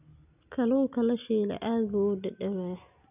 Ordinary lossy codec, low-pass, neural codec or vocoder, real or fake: none; 3.6 kHz; none; real